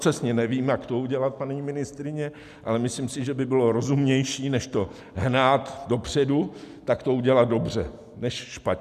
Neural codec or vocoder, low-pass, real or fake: none; 14.4 kHz; real